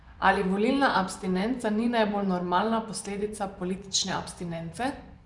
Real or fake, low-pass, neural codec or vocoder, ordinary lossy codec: fake; 10.8 kHz; vocoder, 24 kHz, 100 mel bands, Vocos; none